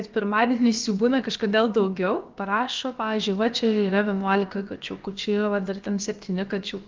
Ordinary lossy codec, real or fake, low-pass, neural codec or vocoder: Opus, 24 kbps; fake; 7.2 kHz; codec, 16 kHz, about 1 kbps, DyCAST, with the encoder's durations